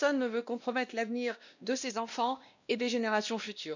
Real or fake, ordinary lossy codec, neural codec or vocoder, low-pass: fake; none; codec, 16 kHz, 1 kbps, X-Codec, WavLM features, trained on Multilingual LibriSpeech; 7.2 kHz